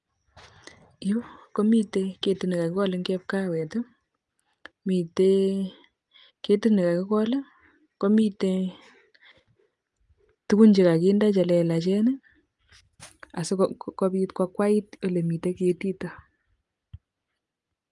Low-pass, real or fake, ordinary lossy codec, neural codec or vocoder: 10.8 kHz; real; Opus, 32 kbps; none